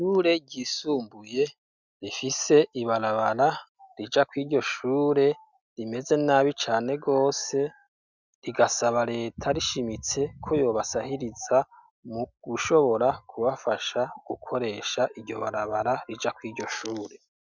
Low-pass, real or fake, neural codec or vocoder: 7.2 kHz; real; none